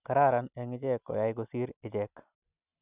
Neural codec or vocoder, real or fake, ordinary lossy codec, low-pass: none; real; none; 3.6 kHz